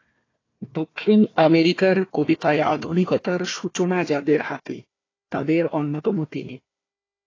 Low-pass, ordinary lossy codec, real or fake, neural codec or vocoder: 7.2 kHz; AAC, 32 kbps; fake; codec, 16 kHz, 1 kbps, FunCodec, trained on Chinese and English, 50 frames a second